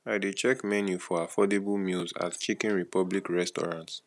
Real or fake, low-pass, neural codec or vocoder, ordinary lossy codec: real; none; none; none